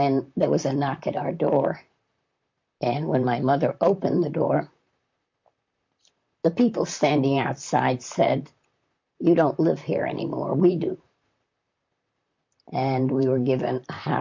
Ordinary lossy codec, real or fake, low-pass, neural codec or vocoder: MP3, 48 kbps; fake; 7.2 kHz; vocoder, 44.1 kHz, 128 mel bands, Pupu-Vocoder